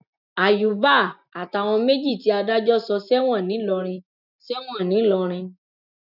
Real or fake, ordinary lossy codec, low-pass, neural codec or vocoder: real; none; 5.4 kHz; none